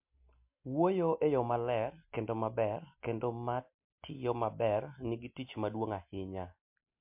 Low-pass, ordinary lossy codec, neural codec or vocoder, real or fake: 3.6 kHz; MP3, 32 kbps; none; real